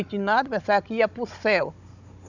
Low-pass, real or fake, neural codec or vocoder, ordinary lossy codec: 7.2 kHz; fake; codec, 16 kHz, 16 kbps, FunCodec, trained on Chinese and English, 50 frames a second; none